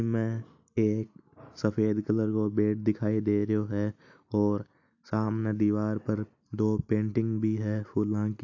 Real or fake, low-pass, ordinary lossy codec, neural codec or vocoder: real; 7.2 kHz; AAC, 48 kbps; none